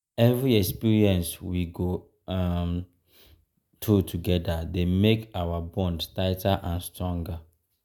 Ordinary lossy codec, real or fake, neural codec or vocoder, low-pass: none; real; none; 19.8 kHz